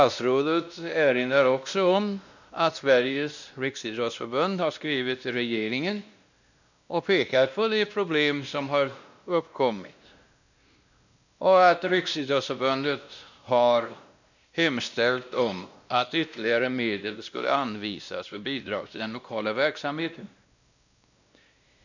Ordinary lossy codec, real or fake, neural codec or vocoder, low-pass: none; fake; codec, 16 kHz, 1 kbps, X-Codec, WavLM features, trained on Multilingual LibriSpeech; 7.2 kHz